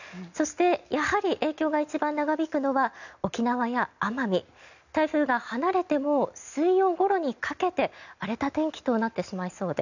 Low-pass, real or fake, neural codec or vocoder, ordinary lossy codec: 7.2 kHz; real; none; none